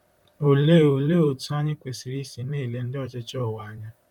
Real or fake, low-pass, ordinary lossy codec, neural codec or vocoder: fake; 19.8 kHz; none; vocoder, 44.1 kHz, 128 mel bands, Pupu-Vocoder